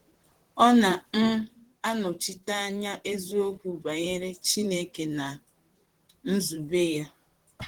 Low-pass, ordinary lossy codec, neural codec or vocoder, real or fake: 19.8 kHz; Opus, 16 kbps; vocoder, 44.1 kHz, 128 mel bands, Pupu-Vocoder; fake